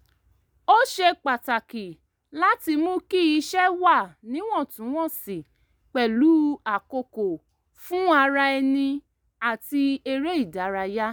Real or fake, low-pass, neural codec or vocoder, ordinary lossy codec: real; none; none; none